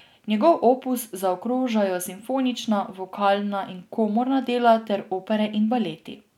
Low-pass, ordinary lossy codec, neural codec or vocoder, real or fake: 19.8 kHz; none; none; real